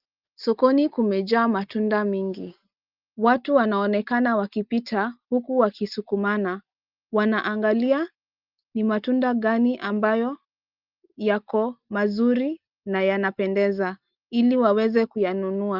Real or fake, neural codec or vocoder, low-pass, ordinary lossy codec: real; none; 5.4 kHz; Opus, 24 kbps